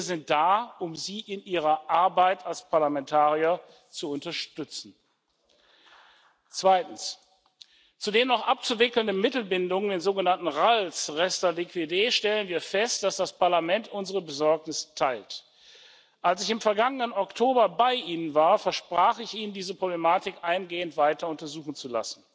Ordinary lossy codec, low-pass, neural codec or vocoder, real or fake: none; none; none; real